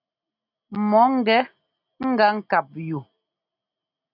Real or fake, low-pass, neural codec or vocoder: real; 5.4 kHz; none